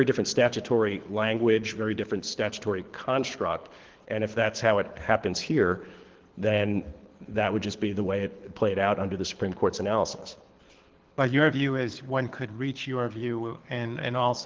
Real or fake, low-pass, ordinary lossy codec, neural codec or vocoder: fake; 7.2 kHz; Opus, 16 kbps; codec, 24 kHz, 6 kbps, HILCodec